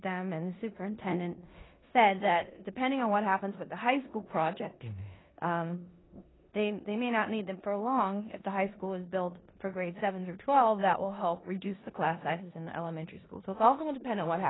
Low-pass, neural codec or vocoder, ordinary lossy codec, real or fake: 7.2 kHz; codec, 16 kHz in and 24 kHz out, 0.9 kbps, LongCat-Audio-Codec, four codebook decoder; AAC, 16 kbps; fake